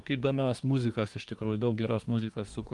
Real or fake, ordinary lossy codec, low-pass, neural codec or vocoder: fake; Opus, 32 kbps; 10.8 kHz; codec, 24 kHz, 1 kbps, SNAC